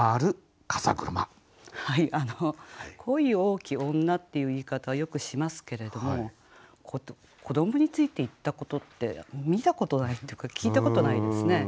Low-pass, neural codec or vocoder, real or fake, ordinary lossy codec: none; none; real; none